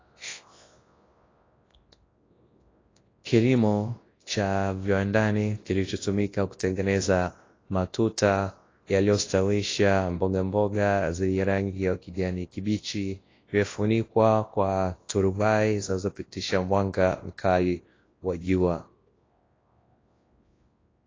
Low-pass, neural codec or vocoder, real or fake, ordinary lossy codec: 7.2 kHz; codec, 24 kHz, 0.9 kbps, WavTokenizer, large speech release; fake; AAC, 32 kbps